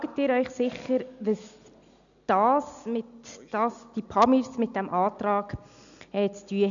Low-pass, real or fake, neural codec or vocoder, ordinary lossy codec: 7.2 kHz; real; none; none